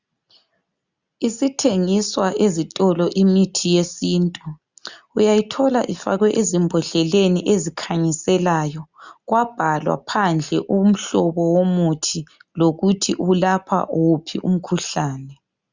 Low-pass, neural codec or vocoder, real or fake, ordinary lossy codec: 7.2 kHz; none; real; Opus, 64 kbps